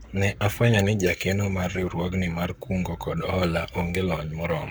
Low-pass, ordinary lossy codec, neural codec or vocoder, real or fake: none; none; codec, 44.1 kHz, 7.8 kbps, Pupu-Codec; fake